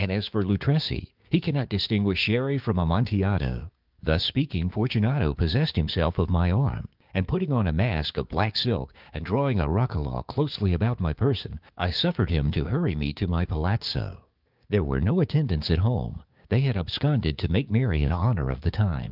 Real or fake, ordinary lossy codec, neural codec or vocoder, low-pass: fake; Opus, 32 kbps; codec, 16 kHz, 6 kbps, DAC; 5.4 kHz